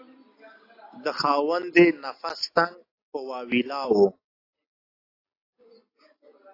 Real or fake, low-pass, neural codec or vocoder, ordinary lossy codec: real; 5.4 kHz; none; AAC, 32 kbps